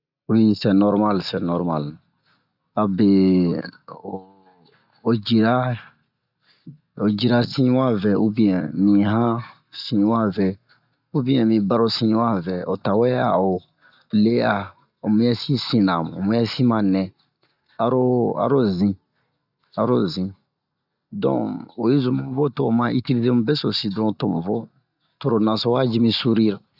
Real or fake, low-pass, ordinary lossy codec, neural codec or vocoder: real; 5.4 kHz; none; none